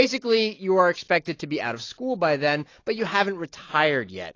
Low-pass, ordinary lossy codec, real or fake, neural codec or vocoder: 7.2 kHz; AAC, 32 kbps; real; none